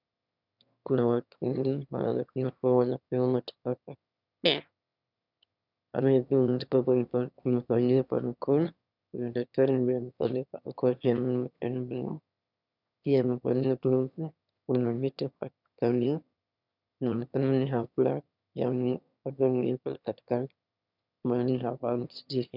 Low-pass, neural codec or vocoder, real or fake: 5.4 kHz; autoencoder, 22.05 kHz, a latent of 192 numbers a frame, VITS, trained on one speaker; fake